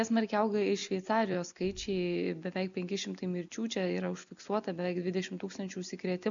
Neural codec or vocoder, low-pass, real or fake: none; 7.2 kHz; real